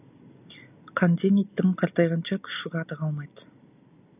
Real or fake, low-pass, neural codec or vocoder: real; 3.6 kHz; none